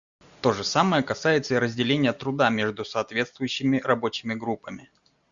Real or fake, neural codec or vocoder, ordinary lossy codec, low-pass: real; none; Opus, 64 kbps; 7.2 kHz